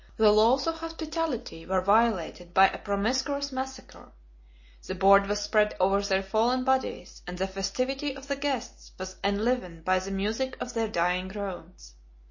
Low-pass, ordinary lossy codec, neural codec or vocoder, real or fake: 7.2 kHz; MP3, 32 kbps; none; real